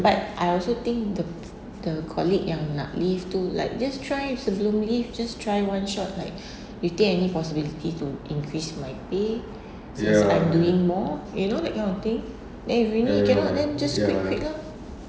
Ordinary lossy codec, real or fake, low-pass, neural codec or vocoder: none; real; none; none